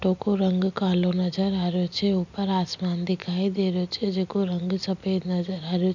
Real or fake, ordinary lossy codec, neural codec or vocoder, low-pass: real; none; none; 7.2 kHz